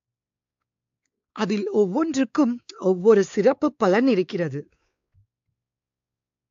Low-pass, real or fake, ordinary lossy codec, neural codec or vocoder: 7.2 kHz; fake; AAC, 48 kbps; codec, 16 kHz, 4 kbps, X-Codec, WavLM features, trained on Multilingual LibriSpeech